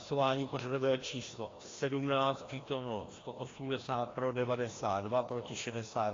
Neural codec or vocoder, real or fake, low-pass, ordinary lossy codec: codec, 16 kHz, 1 kbps, FreqCodec, larger model; fake; 7.2 kHz; AAC, 32 kbps